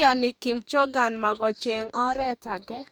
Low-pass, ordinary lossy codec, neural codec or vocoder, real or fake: none; none; codec, 44.1 kHz, 2.6 kbps, DAC; fake